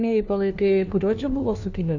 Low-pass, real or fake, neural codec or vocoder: 7.2 kHz; fake; codec, 16 kHz, 1 kbps, FunCodec, trained on LibriTTS, 50 frames a second